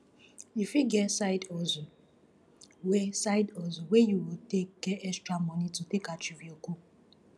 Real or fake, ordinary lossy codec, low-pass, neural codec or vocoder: real; none; none; none